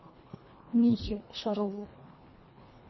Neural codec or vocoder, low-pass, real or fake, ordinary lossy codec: codec, 24 kHz, 1.5 kbps, HILCodec; 7.2 kHz; fake; MP3, 24 kbps